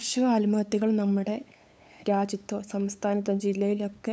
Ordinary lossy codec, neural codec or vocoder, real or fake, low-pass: none; codec, 16 kHz, 8 kbps, FunCodec, trained on LibriTTS, 25 frames a second; fake; none